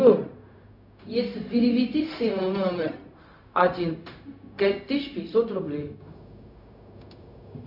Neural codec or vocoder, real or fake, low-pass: codec, 16 kHz, 0.4 kbps, LongCat-Audio-Codec; fake; 5.4 kHz